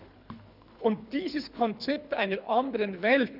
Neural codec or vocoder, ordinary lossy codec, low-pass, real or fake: codec, 24 kHz, 3 kbps, HILCodec; none; 5.4 kHz; fake